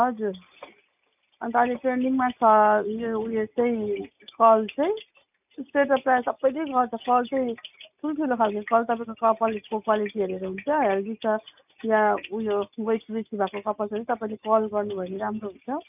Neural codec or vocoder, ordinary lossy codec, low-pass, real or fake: none; none; 3.6 kHz; real